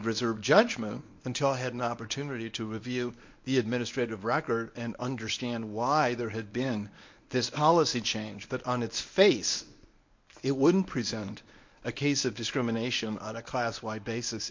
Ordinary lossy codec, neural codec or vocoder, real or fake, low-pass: MP3, 48 kbps; codec, 24 kHz, 0.9 kbps, WavTokenizer, medium speech release version 1; fake; 7.2 kHz